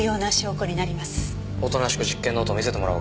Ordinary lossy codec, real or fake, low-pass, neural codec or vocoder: none; real; none; none